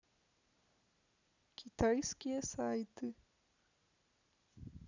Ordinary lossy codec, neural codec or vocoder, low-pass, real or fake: none; none; 7.2 kHz; real